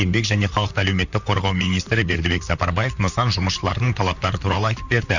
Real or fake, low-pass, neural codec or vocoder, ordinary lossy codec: fake; 7.2 kHz; vocoder, 44.1 kHz, 128 mel bands, Pupu-Vocoder; none